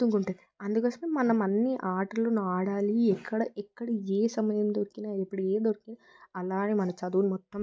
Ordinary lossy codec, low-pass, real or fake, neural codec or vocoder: none; none; real; none